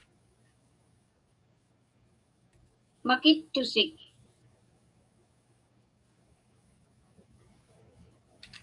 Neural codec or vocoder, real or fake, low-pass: codec, 44.1 kHz, 7.8 kbps, DAC; fake; 10.8 kHz